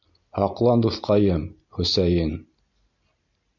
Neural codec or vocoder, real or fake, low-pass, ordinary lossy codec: none; real; 7.2 kHz; MP3, 64 kbps